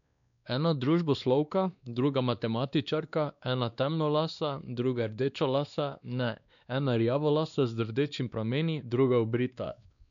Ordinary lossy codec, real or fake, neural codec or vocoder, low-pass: none; fake; codec, 16 kHz, 2 kbps, X-Codec, WavLM features, trained on Multilingual LibriSpeech; 7.2 kHz